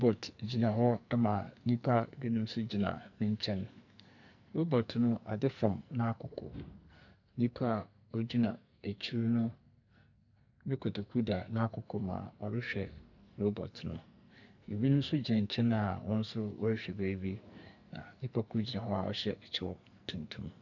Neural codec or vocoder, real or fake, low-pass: codec, 44.1 kHz, 2.6 kbps, SNAC; fake; 7.2 kHz